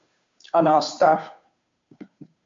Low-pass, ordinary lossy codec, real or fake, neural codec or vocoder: 7.2 kHz; MP3, 48 kbps; fake; codec, 16 kHz, 2 kbps, FunCodec, trained on Chinese and English, 25 frames a second